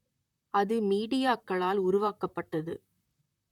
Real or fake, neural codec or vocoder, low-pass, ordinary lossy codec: fake; vocoder, 44.1 kHz, 128 mel bands, Pupu-Vocoder; 19.8 kHz; none